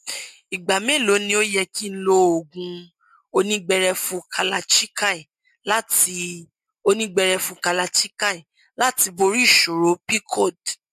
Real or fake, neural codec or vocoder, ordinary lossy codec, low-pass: real; none; MP3, 64 kbps; 14.4 kHz